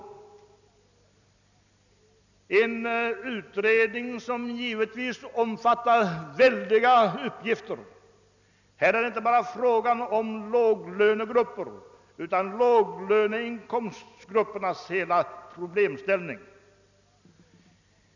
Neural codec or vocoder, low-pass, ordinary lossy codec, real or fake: none; 7.2 kHz; none; real